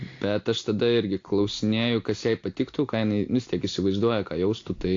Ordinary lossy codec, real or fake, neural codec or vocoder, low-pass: AAC, 48 kbps; real; none; 7.2 kHz